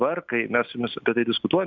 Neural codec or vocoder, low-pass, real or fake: none; 7.2 kHz; real